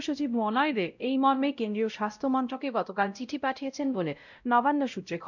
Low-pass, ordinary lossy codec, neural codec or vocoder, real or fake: 7.2 kHz; none; codec, 16 kHz, 0.5 kbps, X-Codec, WavLM features, trained on Multilingual LibriSpeech; fake